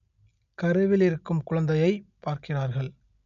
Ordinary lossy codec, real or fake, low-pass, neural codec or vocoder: none; real; 7.2 kHz; none